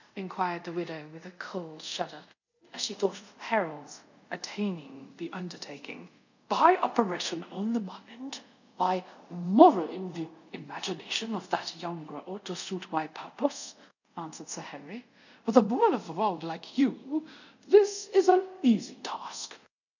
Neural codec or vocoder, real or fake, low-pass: codec, 24 kHz, 0.5 kbps, DualCodec; fake; 7.2 kHz